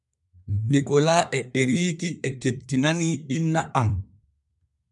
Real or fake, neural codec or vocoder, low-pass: fake; codec, 24 kHz, 1 kbps, SNAC; 10.8 kHz